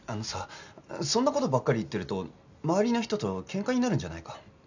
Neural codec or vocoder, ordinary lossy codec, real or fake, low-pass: none; none; real; 7.2 kHz